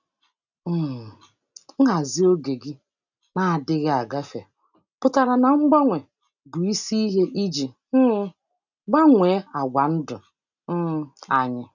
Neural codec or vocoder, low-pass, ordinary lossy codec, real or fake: none; 7.2 kHz; none; real